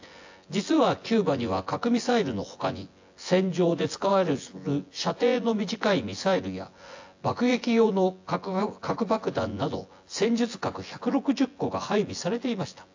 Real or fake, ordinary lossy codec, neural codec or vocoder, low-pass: fake; AAC, 48 kbps; vocoder, 24 kHz, 100 mel bands, Vocos; 7.2 kHz